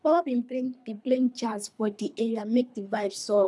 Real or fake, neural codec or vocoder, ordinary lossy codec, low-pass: fake; codec, 24 kHz, 3 kbps, HILCodec; none; none